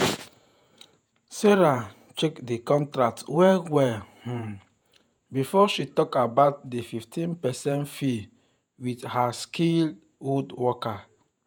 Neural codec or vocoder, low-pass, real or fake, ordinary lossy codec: vocoder, 48 kHz, 128 mel bands, Vocos; none; fake; none